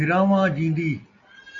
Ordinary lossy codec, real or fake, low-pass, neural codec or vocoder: AAC, 64 kbps; real; 7.2 kHz; none